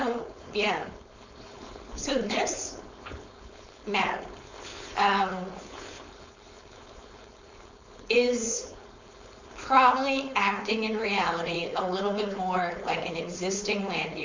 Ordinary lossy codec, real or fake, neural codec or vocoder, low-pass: MP3, 64 kbps; fake; codec, 16 kHz, 4.8 kbps, FACodec; 7.2 kHz